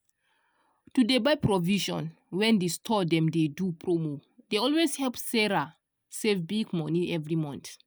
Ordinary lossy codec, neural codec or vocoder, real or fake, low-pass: none; none; real; none